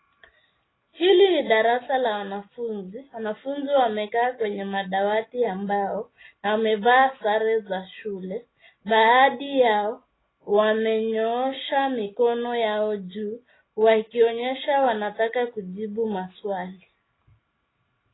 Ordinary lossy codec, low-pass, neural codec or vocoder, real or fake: AAC, 16 kbps; 7.2 kHz; none; real